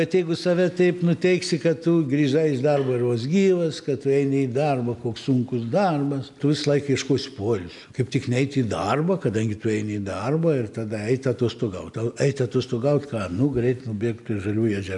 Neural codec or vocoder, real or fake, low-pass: none; real; 10.8 kHz